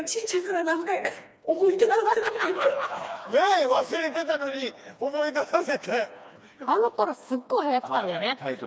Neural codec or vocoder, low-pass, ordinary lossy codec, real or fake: codec, 16 kHz, 2 kbps, FreqCodec, smaller model; none; none; fake